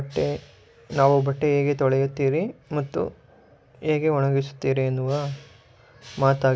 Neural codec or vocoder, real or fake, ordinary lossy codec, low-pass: none; real; none; none